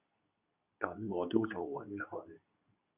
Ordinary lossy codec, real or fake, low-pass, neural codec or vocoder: Opus, 64 kbps; fake; 3.6 kHz; codec, 24 kHz, 0.9 kbps, WavTokenizer, medium speech release version 2